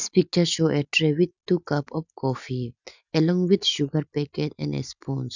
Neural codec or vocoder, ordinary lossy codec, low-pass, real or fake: none; none; 7.2 kHz; real